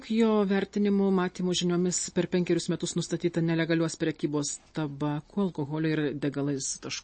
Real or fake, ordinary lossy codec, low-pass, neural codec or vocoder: real; MP3, 32 kbps; 9.9 kHz; none